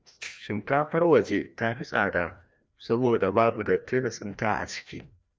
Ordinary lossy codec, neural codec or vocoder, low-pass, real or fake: none; codec, 16 kHz, 1 kbps, FreqCodec, larger model; none; fake